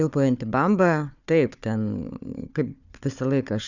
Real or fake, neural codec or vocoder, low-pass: fake; codec, 16 kHz, 4 kbps, FunCodec, trained on Chinese and English, 50 frames a second; 7.2 kHz